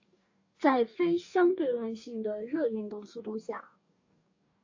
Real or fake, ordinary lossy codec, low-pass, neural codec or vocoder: fake; AAC, 48 kbps; 7.2 kHz; codec, 44.1 kHz, 2.6 kbps, DAC